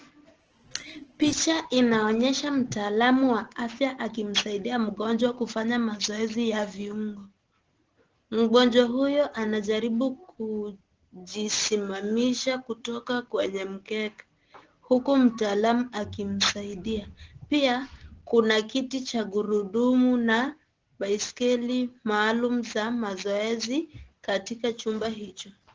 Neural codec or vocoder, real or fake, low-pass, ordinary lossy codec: none; real; 7.2 kHz; Opus, 16 kbps